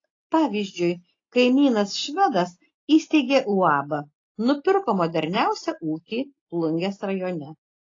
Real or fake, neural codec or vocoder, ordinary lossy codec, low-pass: real; none; AAC, 32 kbps; 7.2 kHz